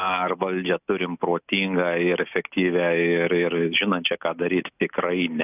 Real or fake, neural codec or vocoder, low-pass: real; none; 3.6 kHz